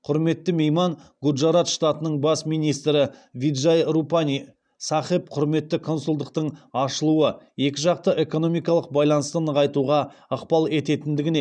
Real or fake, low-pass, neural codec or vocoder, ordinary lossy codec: fake; 9.9 kHz; vocoder, 44.1 kHz, 128 mel bands every 512 samples, BigVGAN v2; none